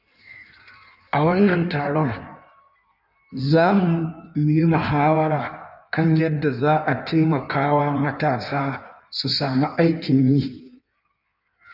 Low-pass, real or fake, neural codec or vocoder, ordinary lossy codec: 5.4 kHz; fake; codec, 16 kHz in and 24 kHz out, 1.1 kbps, FireRedTTS-2 codec; none